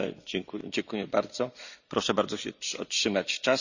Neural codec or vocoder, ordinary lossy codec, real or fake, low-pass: none; none; real; 7.2 kHz